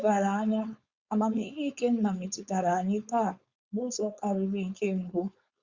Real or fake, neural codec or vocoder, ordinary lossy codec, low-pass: fake; codec, 16 kHz, 4.8 kbps, FACodec; Opus, 64 kbps; 7.2 kHz